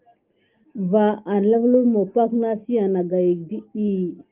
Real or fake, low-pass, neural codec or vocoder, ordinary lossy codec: real; 3.6 kHz; none; Opus, 32 kbps